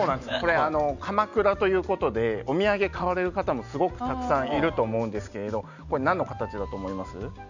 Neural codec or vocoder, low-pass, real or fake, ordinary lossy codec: none; 7.2 kHz; real; none